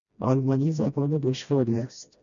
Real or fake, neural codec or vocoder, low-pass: fake; codec, 16 kHz, 1 kbps, FreqCodec, smaller model; 7.2 kHz